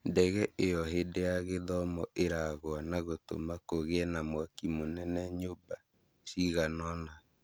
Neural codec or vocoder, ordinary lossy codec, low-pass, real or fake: none; none; none; real